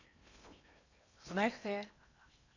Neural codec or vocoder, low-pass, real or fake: codec, 16 kHz in and 24 kHz out, 0.8 kbps, FocalCodec, streaming, 65536 codes; 7.2 kHz; fake